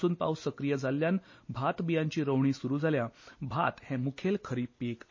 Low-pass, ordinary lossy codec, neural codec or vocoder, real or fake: 7.2 kHz; MP3, 32 kbps; none; real